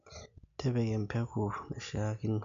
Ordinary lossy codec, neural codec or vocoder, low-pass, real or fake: MP3, 64 kbps; none; 7.2 kHz; real